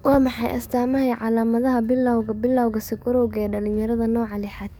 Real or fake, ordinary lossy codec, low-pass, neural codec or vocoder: fake; none; none; codec, 44.1 kHz, 7.8 kbps, DAC